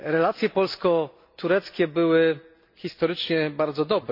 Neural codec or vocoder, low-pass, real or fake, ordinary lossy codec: none; 5.4 kHz; real; MP3, 32 kbps